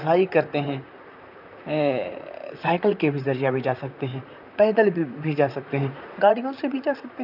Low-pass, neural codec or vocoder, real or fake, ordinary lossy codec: 5.4 kHz; vocoder, 44.1 kHz, 128 mel bands, Pupu-Vocoder; fake; none